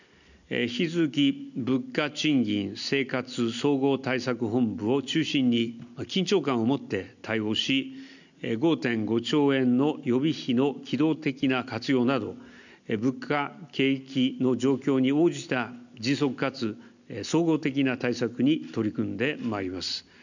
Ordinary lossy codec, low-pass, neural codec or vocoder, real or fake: none; 7.2 kHz; none; real